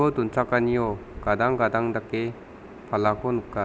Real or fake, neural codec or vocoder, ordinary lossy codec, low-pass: real; none; none; none